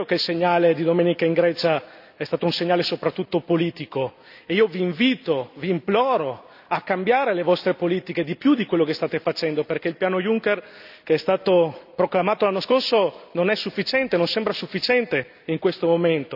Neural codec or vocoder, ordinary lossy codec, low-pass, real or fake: none; none; 5.4 kHz; real